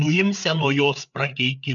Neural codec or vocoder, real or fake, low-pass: codec, 16 kHz, 8 kbps, FreqCodec, larger model; fake; 7.2 kHz